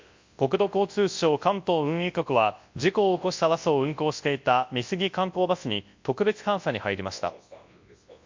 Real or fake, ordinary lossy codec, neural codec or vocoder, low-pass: fake; MP3, 48 kbps; codec, 24 kHz, 0.9 kbps, WavTokenizer, large speech release; 7.2 kHz